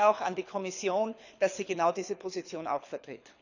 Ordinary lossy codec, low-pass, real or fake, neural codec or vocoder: none; 7.2 kHz; fake; codec, 24 kHz, 6 kbps, HILCodec